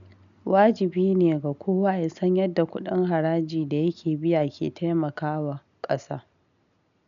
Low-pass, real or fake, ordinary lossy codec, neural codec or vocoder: 7.2 kHz; real; none; none